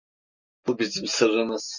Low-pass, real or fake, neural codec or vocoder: 7.2 kHz; real; none